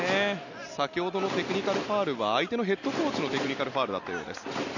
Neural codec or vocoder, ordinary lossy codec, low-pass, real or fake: none; none; 7.2 kHz; real